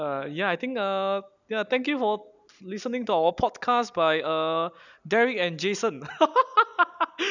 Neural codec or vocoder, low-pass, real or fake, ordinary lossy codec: none; 7.2 kHz; real; none